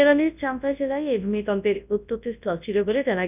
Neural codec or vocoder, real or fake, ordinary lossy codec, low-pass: codec, 24 kHz, 0.9 kbps, WavTokenizer, large speech release; fake; none; 3.6 kHz